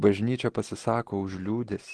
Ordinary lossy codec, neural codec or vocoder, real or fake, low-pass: Opus, 16 kbps; none; real; 10.8 kHz